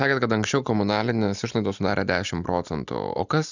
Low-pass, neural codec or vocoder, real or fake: 7.2 kHz; none; real